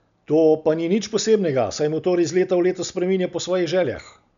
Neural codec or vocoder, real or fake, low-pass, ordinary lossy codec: none; real; 7.2 kHz; none